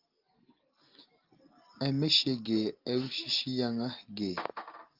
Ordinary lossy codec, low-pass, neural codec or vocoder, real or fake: Opus, 32 kbps; 5.4 kHz; none; real